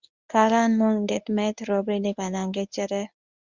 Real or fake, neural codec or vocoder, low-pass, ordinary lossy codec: fake; codec, 24 kHz, 0.9 kbps, WavTokenizer, medium speech release version 1; 7.2 kHz; Opus, 64 kbps